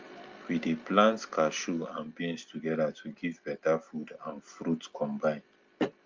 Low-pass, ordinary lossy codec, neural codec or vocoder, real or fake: 7.2 kHz; Opus, 24 kbps; none; real